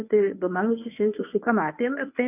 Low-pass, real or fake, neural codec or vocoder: 3.6 kHz; fake; codec, 24 kHz, 0.9 kbps, WavTokenizer, medium speech release version 1